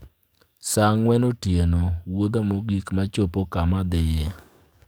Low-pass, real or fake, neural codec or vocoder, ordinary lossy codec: none; fake; codec, 44.1 kHz, 7.8 kbps, DAC; none